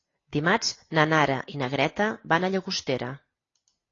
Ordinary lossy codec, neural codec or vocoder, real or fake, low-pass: AAC, 32 kbps; none; real; 7.2 kHz